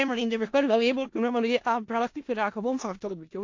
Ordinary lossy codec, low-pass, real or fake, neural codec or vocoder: AAC, 48 kbps; 7.2 kHz; fake; codec, 16 kHz in and 24 kHz out, 0.4 kbps, LongCat-Audio-Codec, four codebook decoder